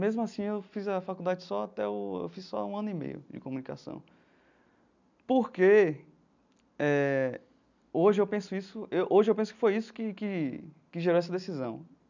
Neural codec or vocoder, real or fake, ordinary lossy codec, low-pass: none; real; none; 7.2 kHz